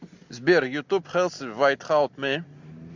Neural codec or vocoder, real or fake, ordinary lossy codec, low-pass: none; real; MP3, 64 kbps; 7.2 kHz